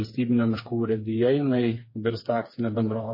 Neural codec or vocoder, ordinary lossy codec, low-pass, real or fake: codec, 16 kHz, 4 kbps, FreqCodec, smaller model; MP3, 24 kbps; 5.4 kHz; fake